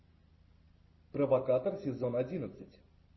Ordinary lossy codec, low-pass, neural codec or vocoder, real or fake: MP3, 24 kbps; 7.2 kHz; none; real